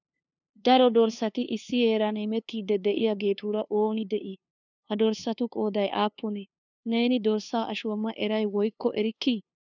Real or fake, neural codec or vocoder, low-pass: fake; codec, 16 kHz, 2 kbps, FunCodec, trained on LibriTTS, 25 frames a second; 7.2 kHz